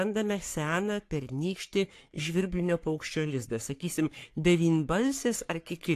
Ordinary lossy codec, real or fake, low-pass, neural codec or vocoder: AAC, 64 kbps; fake; 14.4 kHz; codec, 44.1 kHz, 3.4 kbps, Pupu-Codec